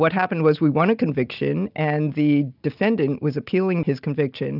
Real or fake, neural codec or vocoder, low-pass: real; none; 5.4 kHz